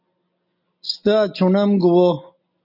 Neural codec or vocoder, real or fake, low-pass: none; real; 5.4 kHz